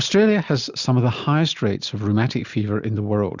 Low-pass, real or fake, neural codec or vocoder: 7.2 kHz; real; none